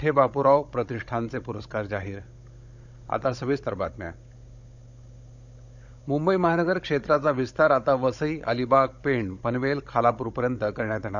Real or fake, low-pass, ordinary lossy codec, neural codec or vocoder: fake; 7.2 kHz; none; codec, 16 kHz, 16 kbps, FunCodec, trained on Chinese and English, 50 frames a second